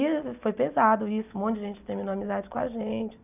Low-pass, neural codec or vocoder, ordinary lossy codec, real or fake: 3.6 kHz; none; none; real